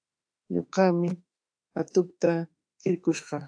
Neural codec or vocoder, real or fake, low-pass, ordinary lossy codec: autoencoder, 48 kHz, 32 numbers a frame, DAC-VAE, trained on Japanese speech; fake; 9.9 kHz; AAC, 64 kbps